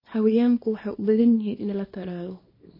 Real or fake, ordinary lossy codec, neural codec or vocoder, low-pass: fake; MP3, 24 kbps; codec, 24 kHz, 0.9 kbps, WavTokenizer, small release; 5.4 kHz